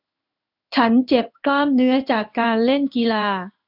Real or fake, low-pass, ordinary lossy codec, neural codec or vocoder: fake; 5.4 kHz; none; codec, 16 kHz in and 24 kHz out, 1 kbps, XY-Tokenizer